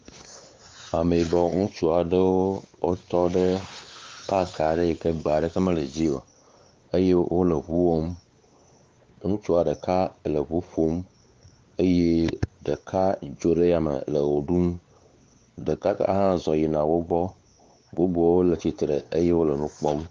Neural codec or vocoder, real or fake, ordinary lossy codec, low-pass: codec, 16 kHz, 4 kbps, X-Codec, WavLM features, trained on Multilingual LibriSpeech; fake; Opus, 32 kbps; 7.2 kHz